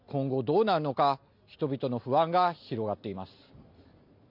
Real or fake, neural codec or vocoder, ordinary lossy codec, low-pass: real; none; none; 5.4 kHz